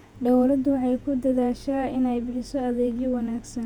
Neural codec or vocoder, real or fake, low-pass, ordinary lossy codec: vocoder, 48 kHz, 128 mel bands, Vocos; fake; 19.8 kHz; none